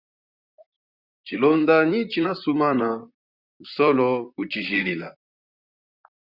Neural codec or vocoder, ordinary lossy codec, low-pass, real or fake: vocoder, 44.1 kHz, 128 mel bands, Pupu-Vocoder; Opus, 64 kbps; 5.4 kHz; fake